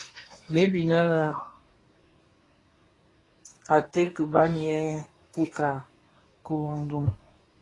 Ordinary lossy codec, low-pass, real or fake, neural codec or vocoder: AAC, 32 kbps; 10.8 kHz; fake; codec, 24 kHz, 1 kbps, SNAC